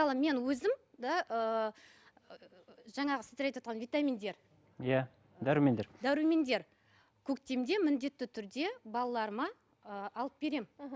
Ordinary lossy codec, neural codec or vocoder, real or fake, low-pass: none; none; real; none